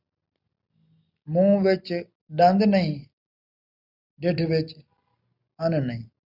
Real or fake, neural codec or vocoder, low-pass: real; none; 5.4 kHz